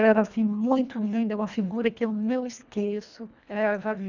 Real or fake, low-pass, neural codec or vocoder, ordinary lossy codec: fake; 7.2 kHz; codec, 24 kHz, 1.5 kbps, HILCodec; none